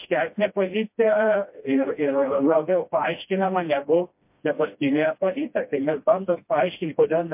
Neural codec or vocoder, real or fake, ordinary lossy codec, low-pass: codec, 16 kHz, 1 kbps, FreqCodec, smaller model; fake; MP3, 32 kbps; 3.6 kHz